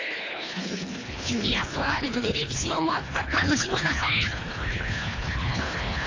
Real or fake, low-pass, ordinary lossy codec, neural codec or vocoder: fake; 7.2 kHz; AAC, 32 kbps; codec, 24 kHz, 1.5 kbps, HILCodec